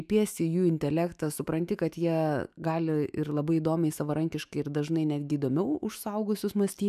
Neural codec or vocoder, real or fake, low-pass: autoencoder, 48 kHz, 128 numbers a frame, DAC-VAE, trained on Japanese speech; fake; 14.4 kHz